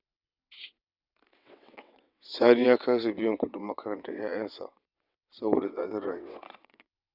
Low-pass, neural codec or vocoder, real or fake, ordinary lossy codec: 5.4 kHz; vocoder, 22.05 kHz, 80 mel bands, WaveNeXt; fake; none